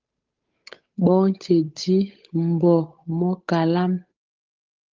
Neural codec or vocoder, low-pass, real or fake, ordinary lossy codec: codec, 16 kHz, 8 kbps, FunCodec, trained on Chinese and English, 25 frames a second; 7.2 kHz; fake; Opus, 32 kbps